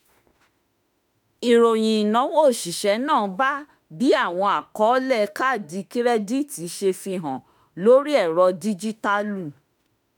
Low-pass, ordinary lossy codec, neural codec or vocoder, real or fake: none; none; autoencoder, 48 kHz, 32 numbers a frame, DAC-VAE, trained on Japanese speech; fake